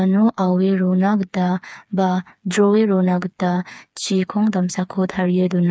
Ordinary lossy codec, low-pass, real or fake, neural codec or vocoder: none; none; fake; codec, 16 kHz, 4 kbps, FreqCodec, smaller model